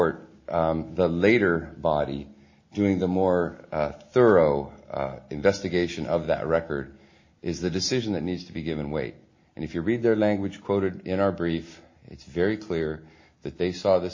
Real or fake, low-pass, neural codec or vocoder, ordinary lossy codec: real; 7.2 kHz; none; MP3, 32 kbps